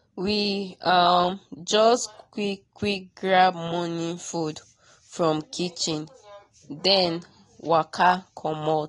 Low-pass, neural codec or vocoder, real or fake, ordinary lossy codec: 10.8 kHz; none; real; AAC, 32 kbps